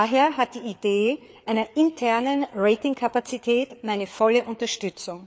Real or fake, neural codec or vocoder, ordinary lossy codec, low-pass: fake; codec, 16 kHz, 4 kbps, FreqCodec, larger model; none; none